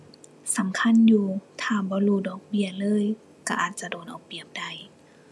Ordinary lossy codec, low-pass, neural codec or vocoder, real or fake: none; none; none; real